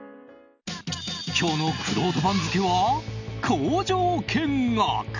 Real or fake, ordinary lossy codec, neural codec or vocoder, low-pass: real; none; none; 7.2 kHz